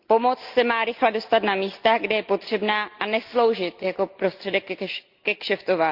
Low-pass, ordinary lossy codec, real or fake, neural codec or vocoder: 5.4 kHz; Opus, 24 kbps; real; none